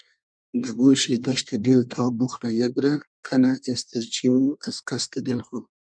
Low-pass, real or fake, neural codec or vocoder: 9.9 kHz; fake; codec, 24 kHz, 1 kbps, SNAC